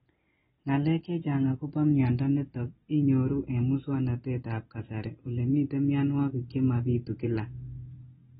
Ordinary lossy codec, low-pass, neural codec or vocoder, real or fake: AAC, 16 kbps; 19.8 kHz; none; real